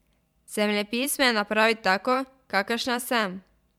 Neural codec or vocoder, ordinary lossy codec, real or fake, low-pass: vocoder, 44.1 kHz, 128 mel bands every 512 samples, BigVGAN v2; MP3, 96 kbps; fake; 19.8 kHz